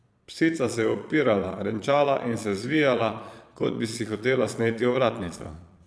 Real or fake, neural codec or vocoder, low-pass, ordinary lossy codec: fake; vocoder, 22.05 kHz, 80 mel bands, Vocos; none; none